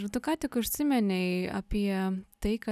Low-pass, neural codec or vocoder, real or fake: 14.4 kHz; none; real